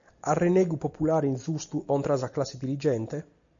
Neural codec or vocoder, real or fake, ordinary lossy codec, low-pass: none; real; MP3, 64 kbps; 7.2 kHz